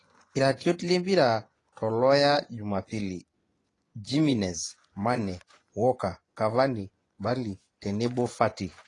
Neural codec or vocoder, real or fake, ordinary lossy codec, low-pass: vocoder, 24 kHz, 100 mel bands, Vocos; fake; AAC, 32 kbps; 10.8 kHz